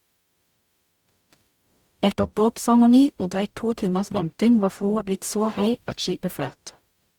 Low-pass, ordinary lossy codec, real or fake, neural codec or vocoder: 19.8 kHz; Opus, 64 kbps; fake; codec, 44.1 kHz, 0.9 kbps, DAC